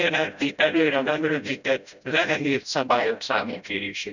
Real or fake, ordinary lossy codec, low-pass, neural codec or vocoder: fake; none; 7.2 kHz; codec, 16 kHz, 0.5 kbps, FreqCodec, smaller model